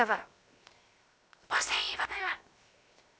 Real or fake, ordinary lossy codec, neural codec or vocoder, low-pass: fake; none; codec, 16 kHz, 0.7 kbps, FocalCodec; none